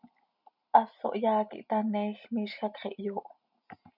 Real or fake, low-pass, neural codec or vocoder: real; 5.4 kHz; none